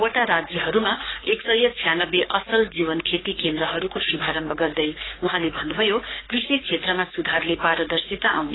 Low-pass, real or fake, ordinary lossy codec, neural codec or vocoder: 7.2 kHz; fake; AAC, 16 kbps; codec, 44.1 kHz, 3.4 kbps, Pupu-Codec